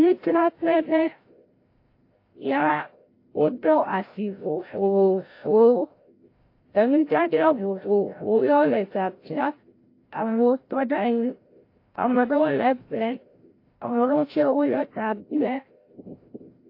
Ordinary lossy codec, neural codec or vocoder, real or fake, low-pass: AAC, 32 kbps; codec, 16 kHz, 0.5 kbps, FreqCodec, larger model; fake; 5.4 kHz